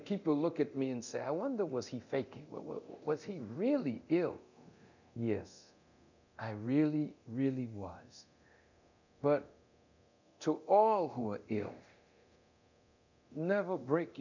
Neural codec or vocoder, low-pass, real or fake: codec, 24 kHz, 0.9 kbps, DualCodec; 7.2 kHz; fake